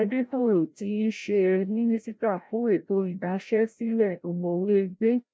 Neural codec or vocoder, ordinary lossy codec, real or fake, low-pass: codec, 16 kHz, 0.5 kbps, FreqCodec, larger model; none; fake; none